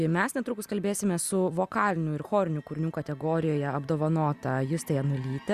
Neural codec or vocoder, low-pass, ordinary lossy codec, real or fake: none; 14.4 kHz; Opus, 64 kbps; real